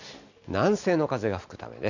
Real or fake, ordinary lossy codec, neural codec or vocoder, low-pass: real; none; none; 7.2 kHz